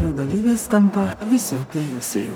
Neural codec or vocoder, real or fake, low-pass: codec, 44.1 kHz, 0.9 kbps, DAC; fake; 19.8 kHz